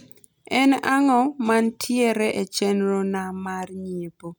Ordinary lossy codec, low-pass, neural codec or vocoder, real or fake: none; none; none; real